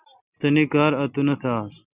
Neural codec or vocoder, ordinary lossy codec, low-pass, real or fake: none; Opus, 64 kbps; 3.6 kHz; real